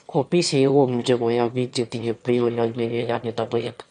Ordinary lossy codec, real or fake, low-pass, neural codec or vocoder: none; fake; 9.9 kHz; autoencoder, 22.05 kHz, a latent of 192 numbers a frame, VITS, trained on one speaker